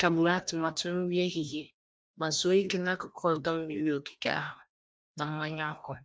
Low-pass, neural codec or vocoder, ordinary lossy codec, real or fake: none; codec, 16 kHz, 1 kbps, FreqCodec, larger model; none; fake